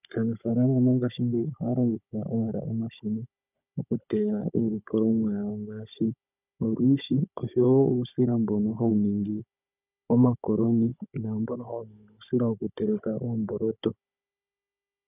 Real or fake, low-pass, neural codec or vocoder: fake; 3.6 kHz; codec, 16 kHz, 16 kbps, FunCodec, trained on Chinese and English, 50 frames a second